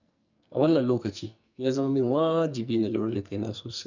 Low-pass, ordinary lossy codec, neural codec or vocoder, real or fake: 7.2 kHz; none; codec, 44.1 kHz, 2.6 kbps, SNAC; fake